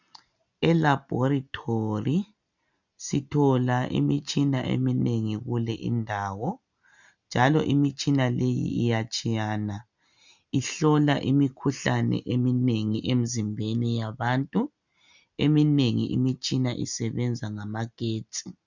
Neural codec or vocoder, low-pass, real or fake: none; 7.2 kHz; real